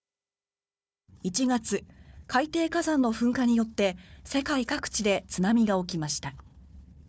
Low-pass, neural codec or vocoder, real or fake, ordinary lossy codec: none; codec, 16 kHz, 4 kbps, FunCodec, trained on Chinese and English, 50 frames a second; fake; none